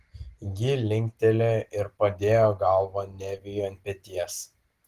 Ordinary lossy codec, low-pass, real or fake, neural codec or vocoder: Opus, 16 kbps; 14.4 kHz; real; none